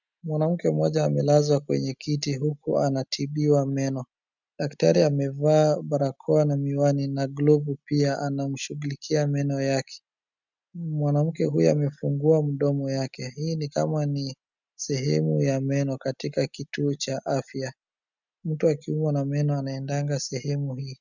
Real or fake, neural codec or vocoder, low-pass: real; none; 7.2 kHz